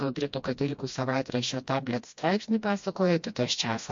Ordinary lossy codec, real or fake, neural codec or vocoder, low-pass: MP3, 48 kbps; fake; codec, 16 kHz, 1 kbps, FreqCodec, smaller model; 7.2 kHz